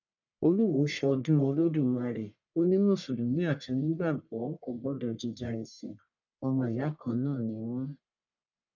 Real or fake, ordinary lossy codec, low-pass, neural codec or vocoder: fake; none; 7.2 kHz; codec, 44.1 kHz, 1.7 kbps, Pupu-Codec